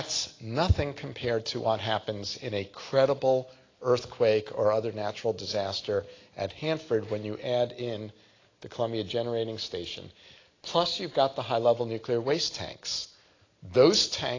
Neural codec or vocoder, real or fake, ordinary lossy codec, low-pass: none; real; AAC, 32 kbps; 7.2 kHz